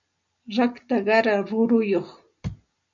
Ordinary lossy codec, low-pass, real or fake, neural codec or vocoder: MP3, 96 kbps; 7.2 kHz; real; none